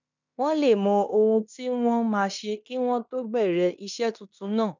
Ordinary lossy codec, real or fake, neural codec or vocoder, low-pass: none; fake; codec, 16 kHz in and 24 kHz out, 0.9 kbps, LongCat-Audio-Codec, fine tuned four codebook decoder; 7.2 kHz